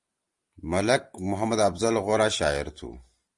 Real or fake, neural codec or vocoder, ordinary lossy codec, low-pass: real; none; Opus, 32 kbps; 10.8 kHz